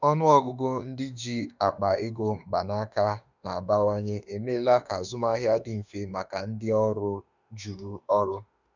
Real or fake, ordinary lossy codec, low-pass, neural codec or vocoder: fake; none; 7.2 kHz; autoencoder, 48 kHz, 32 numbers a frame, DAC-VAE, trained on Japanese speech